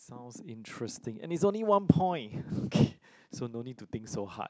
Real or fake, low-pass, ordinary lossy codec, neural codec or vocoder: real; none; none; none